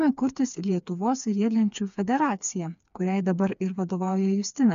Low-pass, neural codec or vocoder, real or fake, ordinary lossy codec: 7.2 kHz; codec, 16 kHz, 4 kbps, FreqCodec, smaller model; fake; MP3, 64 kbps